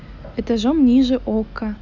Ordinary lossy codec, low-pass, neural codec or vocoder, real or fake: none; 7.2 kHz; none; real